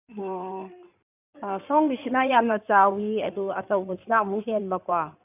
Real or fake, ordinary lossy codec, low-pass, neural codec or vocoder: fake; none; 3.6 kHz; codec, 16 kHz in and 24 kHz out, 2.2 kbps, FireRedTTS-2 codec